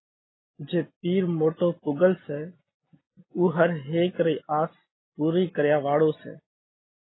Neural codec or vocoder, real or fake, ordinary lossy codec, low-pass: none; real; AAC, 16 kbps; 7.2 kHz